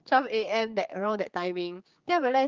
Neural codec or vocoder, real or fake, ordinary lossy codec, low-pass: codec, 16 kHz, 4 kbps, X-Codec, HuBERT features, trained on general audio; fake; Opus, 24 kbps; 7.2 kHz